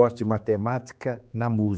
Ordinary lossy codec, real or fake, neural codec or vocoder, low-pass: none; fake; codec, 16 kHz, 2 kbps, X-Codec, HuBERT features, trained on general audio; none